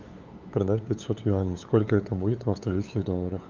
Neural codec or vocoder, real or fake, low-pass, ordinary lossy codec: codec, 16 kHz, 8 kbps, FunCodec, trained on LibriTTS, 25 frames a second; fake; 7.2 kHz; Opus, 32 kbps